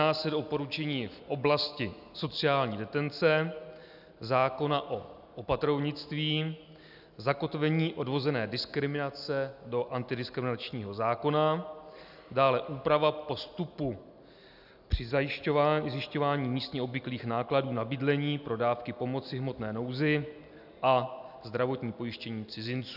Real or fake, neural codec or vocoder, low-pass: real; none; 5.4 kHz